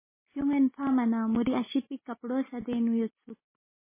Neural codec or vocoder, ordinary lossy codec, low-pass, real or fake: none; MP3, 16 kbps; 3.6 kHz; real